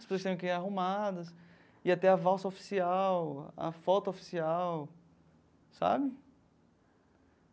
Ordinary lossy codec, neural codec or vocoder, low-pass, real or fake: none; none; none; real